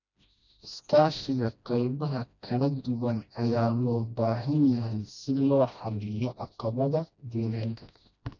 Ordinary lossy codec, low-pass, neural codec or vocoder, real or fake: none; 7.2 kHz; codec, 16 kHz, 1 kbps, FreqCodec, smaller model; fake